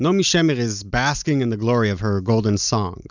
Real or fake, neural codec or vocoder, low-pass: real; none; 7.2 kHz